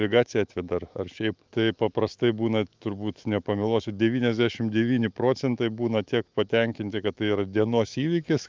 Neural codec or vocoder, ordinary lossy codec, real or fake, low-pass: autoencoder, 48 kHz, 128 numbers a frame, DAC-VAE, trained on Japanese speech; Opus, 32 kbps; fake; 7.2 kHz